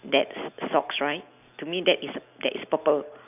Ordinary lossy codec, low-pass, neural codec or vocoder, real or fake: none; 3.6 kHz; none; real